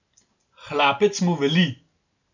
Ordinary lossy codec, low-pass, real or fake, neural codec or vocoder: none; 7.2 kHz; real; none